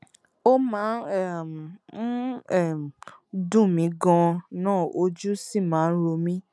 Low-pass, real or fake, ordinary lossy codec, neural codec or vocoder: none; real; none; none